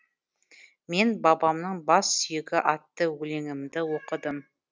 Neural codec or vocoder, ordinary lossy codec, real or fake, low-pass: none; none; real; none